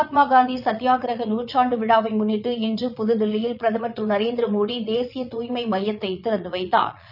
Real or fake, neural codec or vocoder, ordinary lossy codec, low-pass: fake; vocoder, 22.05 kHz, 80 mel bands, Vocos; none; 5.4 kHz